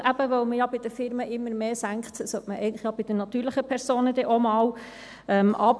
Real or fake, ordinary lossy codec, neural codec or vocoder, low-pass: real; none; none; none